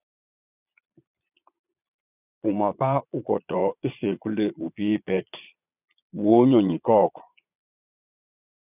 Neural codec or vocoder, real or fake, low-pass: vocoder, 22.05 kHz, 80 mel bands, Vocos; fake; 3.6 kHz